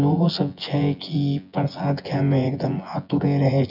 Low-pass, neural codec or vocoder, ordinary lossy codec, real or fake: 5.4 kHz; vocoder, 24 kHz, 100 mel bands, Vocos; none; fake